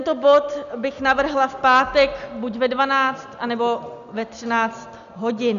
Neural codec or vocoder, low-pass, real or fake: none; 7.2 kHz; real